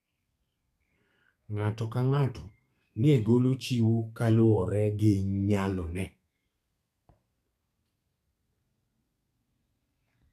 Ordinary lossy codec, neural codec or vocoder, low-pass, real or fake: none; codec, 32 kHz, 1.9 kbps, SNAC; 14.4 kHz; fake